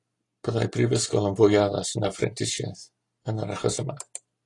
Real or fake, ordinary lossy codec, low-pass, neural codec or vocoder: real; AAC, 32 kbps; 10.8 kHz; none